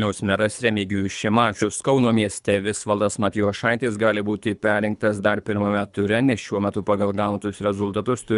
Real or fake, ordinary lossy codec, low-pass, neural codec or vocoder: fake; MP3, 96 kbps; 10.8 kHz; codec, 24 kHz, 3 kbps, HILCodec